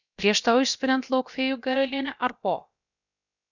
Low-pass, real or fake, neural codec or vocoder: 7.2 kHz; fake; codec, 16 kHz, about 1 kbps, DyCAST, with the encoder's durations